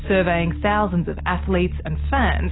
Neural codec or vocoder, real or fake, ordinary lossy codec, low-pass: none; real; AAC, 16 kbps; 7.2 kHz